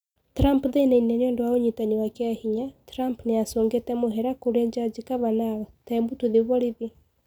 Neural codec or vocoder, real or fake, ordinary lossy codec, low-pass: none; real; none; none